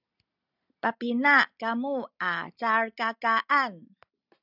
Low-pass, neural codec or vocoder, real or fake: 5.4 kHz; none; real